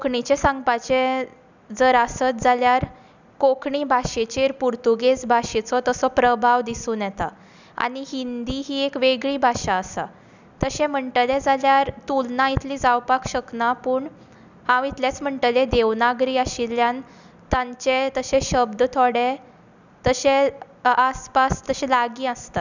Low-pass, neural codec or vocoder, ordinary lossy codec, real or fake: 7.2 kHz; none; none; real